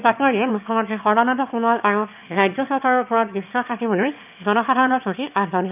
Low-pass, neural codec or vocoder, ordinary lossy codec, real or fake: 3.6 kHz; autoencoder, 22.05 kHz, a latent of 192 numbers a frame, VITS, trained on one speaker; none; fake